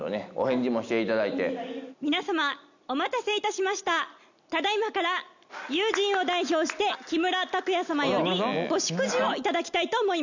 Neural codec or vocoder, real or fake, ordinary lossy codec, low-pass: none; real; none; 7.2 kHz